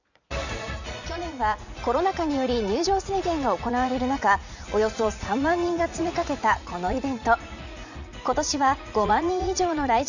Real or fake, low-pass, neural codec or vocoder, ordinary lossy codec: fake; 7.2 kHz; vocoder, 44.1 kHz, 80 mel bands, Vocos; none